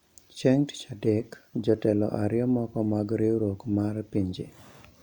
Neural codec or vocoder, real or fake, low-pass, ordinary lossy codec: none; real; 19.8 kHz; none